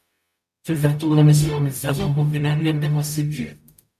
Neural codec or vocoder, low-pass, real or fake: codec, 44.1 kHz, 0.9 kbps, DAC; 14.4 kHz; fake